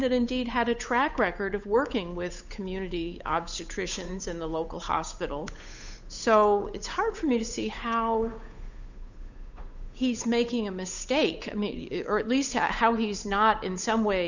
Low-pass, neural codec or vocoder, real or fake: 7.2 kHz; codec, 16 kHz, 8 kbps, FunCodec, trained on Chinese and English, 25 frames a second; fake